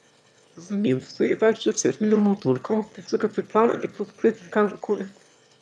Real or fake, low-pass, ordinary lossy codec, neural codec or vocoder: fake; none; none; autoencoder, 22.05 kHz, a latent of 192 numbers a frame, VITS, trained on one speaker